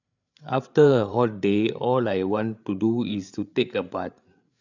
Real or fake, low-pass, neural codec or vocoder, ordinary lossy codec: fake; 7.2 kHz; codec, 16 kHz, 8 kbps, FreqCodec, larger model; none